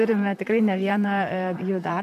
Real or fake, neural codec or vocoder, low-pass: fake; vocoder, 44.1 kHz, 128 mel bands, Pupu-Vocoder; 14.4 kHz